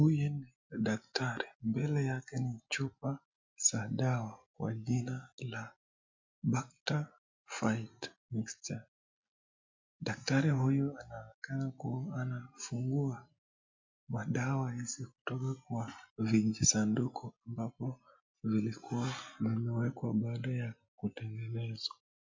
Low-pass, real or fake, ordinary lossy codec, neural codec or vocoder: 7.2 kHz; real; MP3, 64 kbps; none